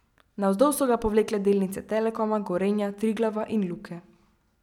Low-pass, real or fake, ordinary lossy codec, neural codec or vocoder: 19.8 kHz; real; none; none